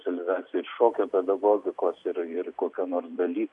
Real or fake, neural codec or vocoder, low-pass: fake; vocoder, 44.1 kHz, 128 mel bands every 256 samples, BigVGAN v2; 10.8 kHz